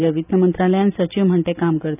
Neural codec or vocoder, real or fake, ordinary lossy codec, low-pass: none; real; none; 3.6 kHz